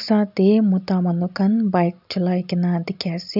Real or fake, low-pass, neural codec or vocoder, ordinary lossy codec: real; 5.4 kHz; none; none